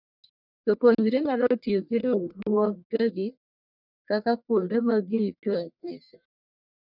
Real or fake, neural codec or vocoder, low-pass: fake; codec, 44.1 kHz, 1.7 kbps, Pupu-Codec; 5.4 kHz